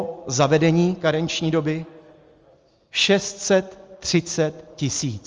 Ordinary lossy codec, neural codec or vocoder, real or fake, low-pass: Opus, 32 kbps; none; real; 7.2 kHz